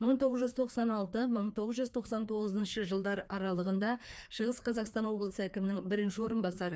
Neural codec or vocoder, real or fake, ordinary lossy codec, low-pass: codec, 16 kHz, 2 kbps, FreqCodec, larger model; fake; none; none